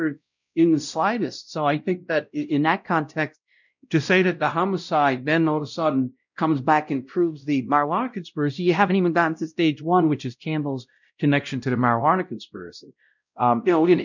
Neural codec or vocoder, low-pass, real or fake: codec, 16 kHz, 0.5 kbps, X-Codec, WavLM features, trained on Multilingual LibriSpeech; 7.2 kHz; fake